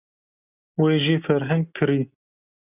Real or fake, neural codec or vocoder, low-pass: real; none; 3.6 kHz